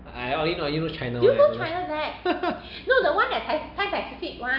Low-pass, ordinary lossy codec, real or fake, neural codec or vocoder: 5.4 kHz; none; real; none